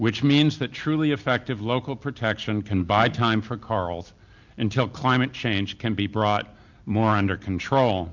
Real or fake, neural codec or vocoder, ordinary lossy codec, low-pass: real; none; MP3, 64 kbps; 7.2 kHz